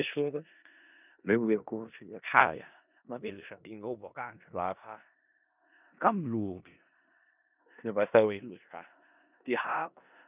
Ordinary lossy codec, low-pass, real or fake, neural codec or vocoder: none; 3.6 kHz; fake; codec, 16 kHz in and 24 kHz out, 0.4 kbps, LongCat-Audio-Codec, four codebook decoder